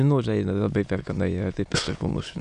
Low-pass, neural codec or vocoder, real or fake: 9.9 kHz; autoencoder, 22.05 kHz, a latent of 192 numbers a frame, VITS, trained on many speakers; fake